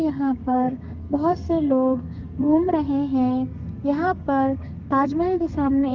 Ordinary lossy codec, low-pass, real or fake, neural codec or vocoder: Opus, 24 kbps; 7.2 kHz; fake; codec, 32 kHz, 1.9 kbps, SNAC